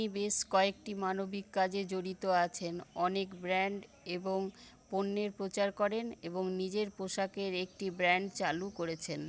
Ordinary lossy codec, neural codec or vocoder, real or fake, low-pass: none; none; real; none